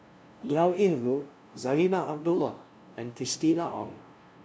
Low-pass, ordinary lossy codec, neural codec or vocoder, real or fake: none; none; codec, 16 kHz, 0.5 kbps, FunCodec, trained on LibriTTS, 25 frames a second; fake